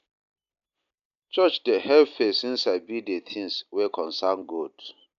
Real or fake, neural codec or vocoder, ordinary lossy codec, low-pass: real; none; AAC, 96 kbps; 7.2 kHz